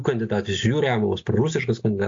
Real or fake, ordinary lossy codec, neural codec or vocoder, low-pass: real; AAC, 48 kbps; none; 7.2 kHz